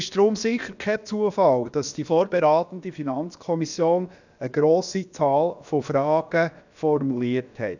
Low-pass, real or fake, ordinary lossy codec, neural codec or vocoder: 7.2 kHz; fake; none; codec, 16 kHz, about 1 kbps, DyCAST, with the encoder's durations